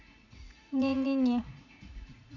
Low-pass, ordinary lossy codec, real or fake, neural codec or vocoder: 7.2 kHz; none; fake; vocoder, 44.1 kHz, 80 mel bands, Vocos